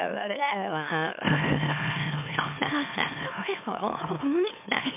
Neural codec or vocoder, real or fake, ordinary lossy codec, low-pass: autoencoder, 44.1 kHz, a latent of 192 numbers a frame, MeloTTS; fake; MP3, 32 kbps; 3.6 kHz